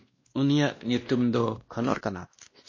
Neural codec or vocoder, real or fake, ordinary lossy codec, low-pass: codec, 16 kHz, 1 kbps, X-Codec, WavLM features, trained on Multilingual LibriSpeech; fake; MP3, 32 kbps; 7.2 kHz